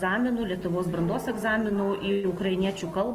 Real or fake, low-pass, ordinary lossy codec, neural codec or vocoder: real; 14.4 kHz; Opus, 24 kbps; none